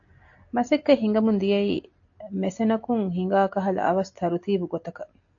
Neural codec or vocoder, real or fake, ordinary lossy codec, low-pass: none; real; AAC, 48 kbps; 7.2 kHz